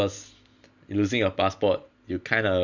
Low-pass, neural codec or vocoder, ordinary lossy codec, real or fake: 7.2 kHz; none; none; real